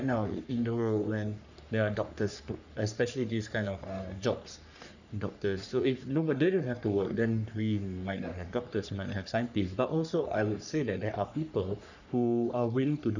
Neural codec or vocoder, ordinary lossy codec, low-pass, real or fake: codec, 44.1 kHz, 3.4 kbps, Pupu-Codec; none; 7.2 kHz; fake